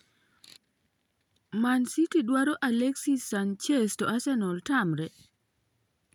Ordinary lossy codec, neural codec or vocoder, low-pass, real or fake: none; none; 19.8 kHz; real